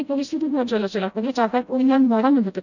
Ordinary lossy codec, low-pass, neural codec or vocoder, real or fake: none; 7.2 kHz; codec, 16 kHz, 0.5 kbps, FreqCodec, smaller model; fake